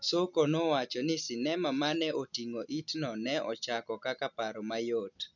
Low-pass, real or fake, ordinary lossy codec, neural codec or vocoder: 7.2 kHz; real; none; none